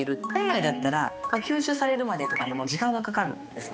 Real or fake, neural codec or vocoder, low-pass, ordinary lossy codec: fake; codec, 16 kHz, 2 kbps, X-Codec, HuBERT features, trained on balanced general audio; none; none